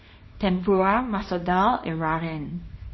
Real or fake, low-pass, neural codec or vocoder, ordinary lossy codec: fake; 7.2 kHz; codec, 24 kHz, 0.9 kbps, WavTokenizer, small release; MP3, 24 kbps